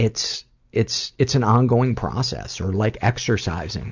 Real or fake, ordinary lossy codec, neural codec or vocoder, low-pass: real; Opus, 64 kbps; none; 7.2 kHz